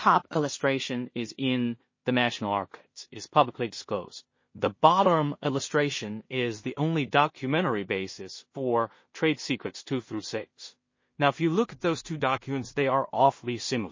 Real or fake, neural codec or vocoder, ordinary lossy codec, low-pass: fake; codec, 16 kHz in and 24 kHz out, 0.4 kbps, LongCat-Audio-Codec, two codebook decoder; MP3, 32 kbps; 7.2 kHz